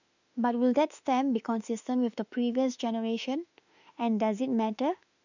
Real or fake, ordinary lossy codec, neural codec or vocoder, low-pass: fake; none; autoencoder, 48 kHz, 32 numbers a frame, DAC-VAE, trained on Japanese speech; 7.2 kHz